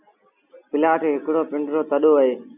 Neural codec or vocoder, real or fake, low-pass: none; real; 3.6 kHz